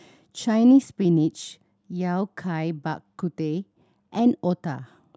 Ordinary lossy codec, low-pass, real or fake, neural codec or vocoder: none; none; real; none